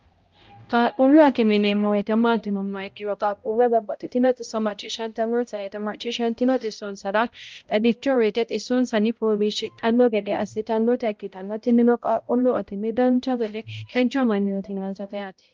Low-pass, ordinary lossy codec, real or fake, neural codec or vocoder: 7.2 kHz; Opus, 24 kbps; fake; codec, 16 kHz, 0.5 kbps, X-Codec, HuBERT features, trained on balanced general audio